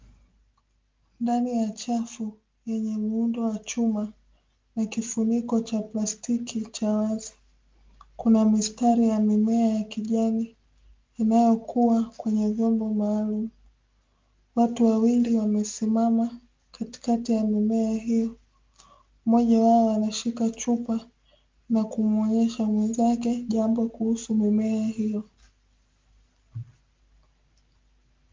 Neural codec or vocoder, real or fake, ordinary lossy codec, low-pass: none; real; Opus, 32 kbps; 7.2 kHz